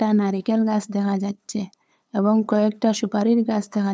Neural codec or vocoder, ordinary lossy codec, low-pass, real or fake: codec, 16 kHz, 8 kbps, FunCodec, trained on LibriTTS, 25 frames a second; none; none; fake